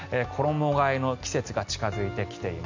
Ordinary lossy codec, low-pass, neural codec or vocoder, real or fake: MP3, 64 kbps; 7.2 kHz; none; real